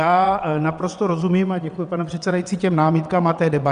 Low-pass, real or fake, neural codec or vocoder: 9.9 kHz; fake; vocoder, 22.05 kHz, 80 mel bands, WaveNeXt